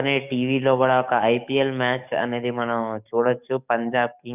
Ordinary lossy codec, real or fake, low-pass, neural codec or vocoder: none; fake; 3.6 kHz; codec, 44.1 kHz, 7.8 kbps, DAC